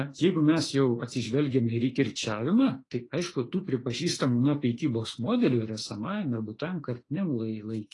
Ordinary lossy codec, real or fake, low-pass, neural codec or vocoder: AAC, 32 kbps; fake; 10.8 kHz; autoencoder, 48 kHz, 32 numbers a frame, DAC-VAE, trained on Japanese speech